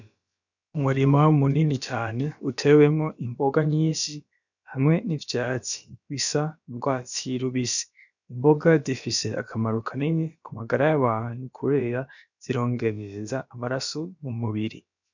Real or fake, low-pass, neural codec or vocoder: fake; 7.2 kHz; codec, 16 kHz, about 1 kbps, DyCAST, with the encoder's durations